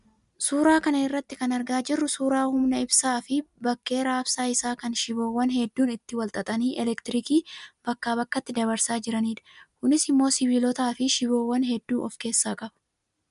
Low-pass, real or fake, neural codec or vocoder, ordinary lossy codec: 10.8 kHz; real; none; MP3, 96 kbps